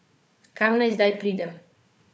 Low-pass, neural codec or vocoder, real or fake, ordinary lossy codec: none; codec, 16 kHz, 4 kbps, FunCodec, trained on Chinese and English, 50 frames a second; fake; none